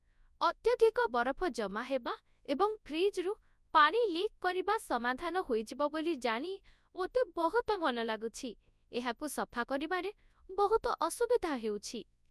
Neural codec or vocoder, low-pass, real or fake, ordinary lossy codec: codec, 24 kHz, 0.9 kbps, WavTokenizer, large speech release; none; fake; none